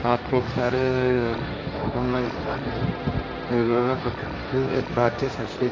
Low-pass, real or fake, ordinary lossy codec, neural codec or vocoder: none; fake; none; codec, 16 kHz, 1.1 kbps, Voila-Tokenizer